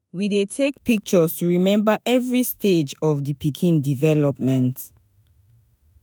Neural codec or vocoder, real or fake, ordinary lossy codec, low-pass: autoencoder, 48 kHz, 32 numbers a frame, DAC-VAE, trained on Japanese speech; fake; none; none